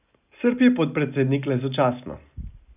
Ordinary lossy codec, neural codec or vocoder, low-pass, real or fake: none; none; 3.6 kHz; real